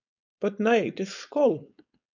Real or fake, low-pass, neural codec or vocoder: fake; 7.2 kHz; codec, 16 kHz, 4.8 kbps, FACodec